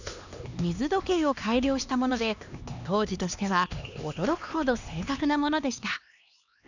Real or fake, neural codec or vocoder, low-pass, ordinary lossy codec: fake; codec, 16 kHz, 2 kbps, X-Codec, HuBERT features, trained on LibriSpeech; 7.2 kHz; none